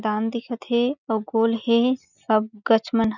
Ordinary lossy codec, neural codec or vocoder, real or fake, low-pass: none; none; real; 7.2 kHz